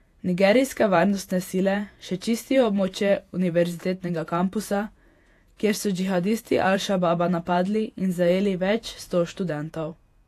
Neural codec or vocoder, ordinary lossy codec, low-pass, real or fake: vocoder, 48 kHz, 128 mel bands, Vocos; AAC, 64 kbps; 14.4 kHz; fake